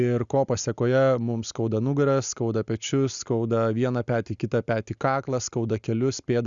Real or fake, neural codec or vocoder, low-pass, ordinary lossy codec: real; none; 7.2 kHz; Opus, 64 kbps